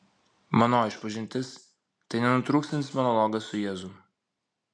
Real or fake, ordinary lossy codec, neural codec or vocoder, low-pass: real; MP3, 64 kbps; none; 9.9 kHz